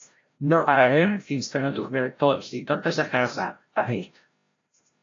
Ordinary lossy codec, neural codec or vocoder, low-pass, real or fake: AAC, 48 kbps; codec, 16 kHz, 0.5 kbps, FreqCodec, larger model; 7.2 kHz; fake